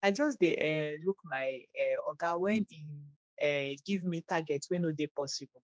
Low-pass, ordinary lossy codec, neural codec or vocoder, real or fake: none; none; codec, 16 kHz, 2 kbps, X-Codec, HuBERT features, trained on general audio; fake